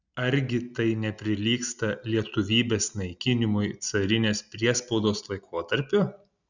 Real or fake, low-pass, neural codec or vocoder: real; 7.2 kHz; none